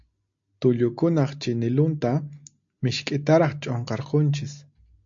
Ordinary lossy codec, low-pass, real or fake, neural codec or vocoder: AAC, 64 kbps; 7.2 kHz; real; none